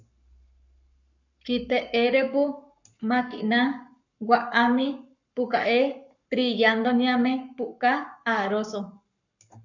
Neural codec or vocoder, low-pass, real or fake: codec, 44.1 kHz, 7.8 kbps, Pupu-Codec; 7.2 kHz; fake